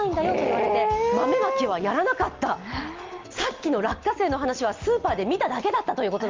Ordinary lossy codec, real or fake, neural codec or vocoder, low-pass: Opus, 24 kbps; real; none; 7.2 kHz